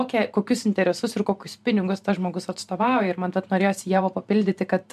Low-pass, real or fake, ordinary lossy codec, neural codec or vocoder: 14.4 kHz; fake; MP3, 96 kbps; vocoder, 44.1 kHz, 128 mel bands every 512 samples, BigVGAN v2